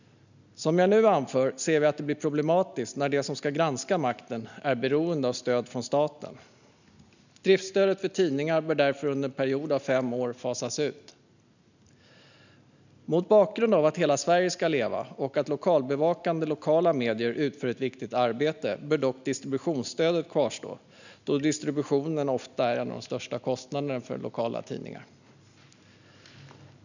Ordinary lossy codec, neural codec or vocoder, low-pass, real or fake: none; none; 7.2 kHz; real